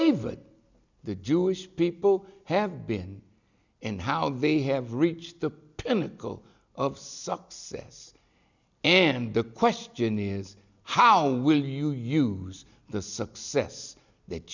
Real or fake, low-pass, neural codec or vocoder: real; 7.2 kHz; none